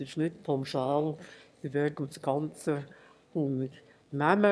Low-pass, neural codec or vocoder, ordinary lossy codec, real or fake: none; autoencoder, 22.05 kHz, a latent of 192 numbers a frame, VITS, trained on one speaker; none; fake